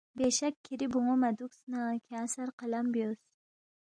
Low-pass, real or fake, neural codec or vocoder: 9.9 kHz; real; none